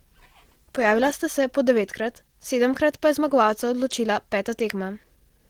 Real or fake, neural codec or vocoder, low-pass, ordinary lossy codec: real; none; 19.8 kHz; Opus, 16 kbps